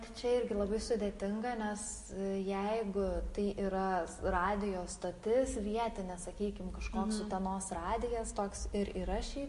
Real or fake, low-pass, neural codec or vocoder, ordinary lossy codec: real; 14.4 kHz; none; MP3, 48 kbps